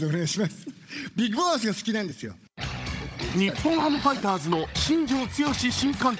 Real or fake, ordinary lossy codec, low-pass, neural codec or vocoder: fake; none; none; codec, 16 kHz, 16 kbps, FunCodec, trained on Chinese and English, 50 frames a second